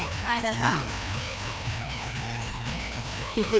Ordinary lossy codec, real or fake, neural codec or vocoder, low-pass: none; fake; codec, 16 kHz, 1 kbps, FreqCodec, larger model; none